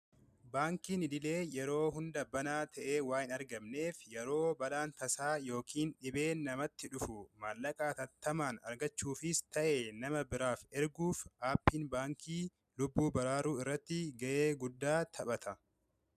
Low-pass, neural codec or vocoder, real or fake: 14.4 kHz; none; real